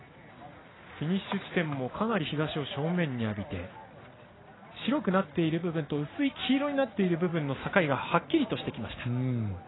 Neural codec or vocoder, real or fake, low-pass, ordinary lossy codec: none; real; 7.2 kHz; AAC, 16 kbps